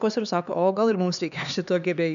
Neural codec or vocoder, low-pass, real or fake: codec, 16 kHz, 4 kbps, X-Codec, HuBERT features, trained on LibriSpeech; 7.2 kHz; fake